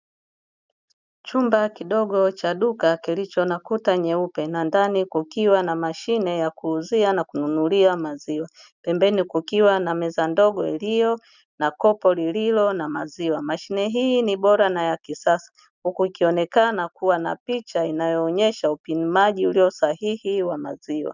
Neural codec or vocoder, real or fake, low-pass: none; real; 7.2 kHz